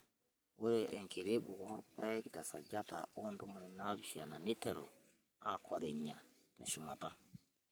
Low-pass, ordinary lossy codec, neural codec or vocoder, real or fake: none; none; codec, 44.1 kHz, 3.4 kbps, Pupu-Codec; fake